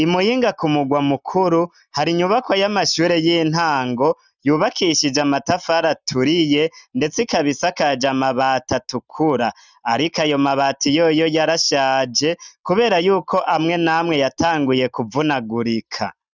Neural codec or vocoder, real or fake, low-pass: none; real; 7.2 kHz